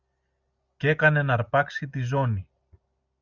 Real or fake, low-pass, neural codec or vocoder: real; 7.2 kHz; none